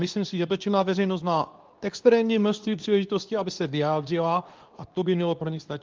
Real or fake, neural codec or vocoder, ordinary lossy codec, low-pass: fake; codec, 24 kHz, 0.9 kbps, WavTokenizer, medium speech release version 2; Opus, 32 kbps; 7.2 kHz